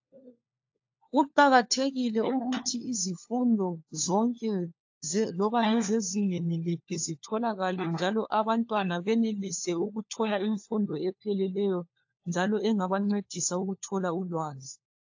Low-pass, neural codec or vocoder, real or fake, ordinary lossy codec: 7.2 kHz; codec, 16 kHz, 4 kbps, FunCodec, trained on LibriTTS, 50 frames a second; fake; AAC, 48 kbps